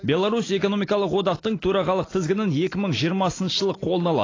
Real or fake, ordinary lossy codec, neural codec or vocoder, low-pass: real; AAC, 32 kbps; none; 7.2 kHz